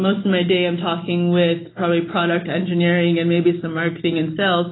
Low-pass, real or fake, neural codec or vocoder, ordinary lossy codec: 7.2 kHz; real; none; AAC, 16 kbps